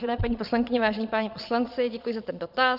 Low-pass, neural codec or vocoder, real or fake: 5.4 kHz; vocoder, 22.05 kHz, 80 mel bands, Vocos; fake